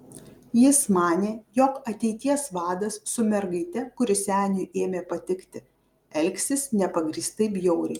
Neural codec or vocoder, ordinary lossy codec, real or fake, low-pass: none; Opus, 32 kbps; real; 19.8 kHz